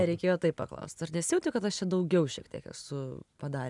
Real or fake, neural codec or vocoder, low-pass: real; none; 10.8 kHz